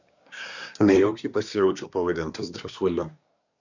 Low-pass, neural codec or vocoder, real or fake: 7.2 kHz; codec, 24 kHz, 1 kbps, SNAC; fake